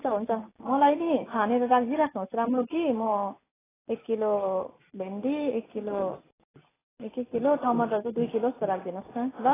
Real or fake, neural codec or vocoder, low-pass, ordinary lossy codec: real; none; 3.6 kHz; AAC, 16 kbps